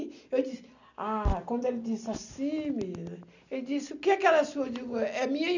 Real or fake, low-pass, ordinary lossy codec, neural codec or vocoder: real; 7.2 kHz; none; none